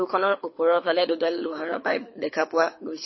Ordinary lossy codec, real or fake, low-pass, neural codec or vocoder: MP3, 24 kbps; fake; 7.2 kHz; codec, 24 kHz, 6 kbps, HILCodec